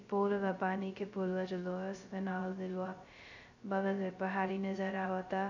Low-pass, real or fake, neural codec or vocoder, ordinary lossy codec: 7.2 kHz; fake; codec, 16 kHz, 0.2 kbps, FocalCodec; none